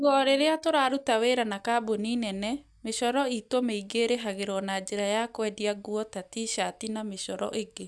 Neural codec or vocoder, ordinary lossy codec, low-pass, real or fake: none; none; none; real